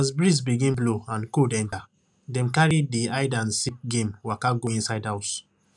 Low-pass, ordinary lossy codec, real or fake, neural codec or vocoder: 10.8 kHz; none; real; none